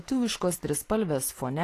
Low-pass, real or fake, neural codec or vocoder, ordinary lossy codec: 14.4 kHz; real; none; AAC, 48 kbps